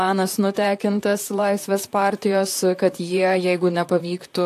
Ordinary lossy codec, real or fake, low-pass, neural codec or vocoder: AAC, 64 kbps; fake; 14.4 kHz; vocoder, 44.1 kHz, 128 mel bands, Pupu-Vocoder